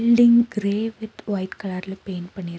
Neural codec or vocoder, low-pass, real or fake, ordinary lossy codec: none; none; real; none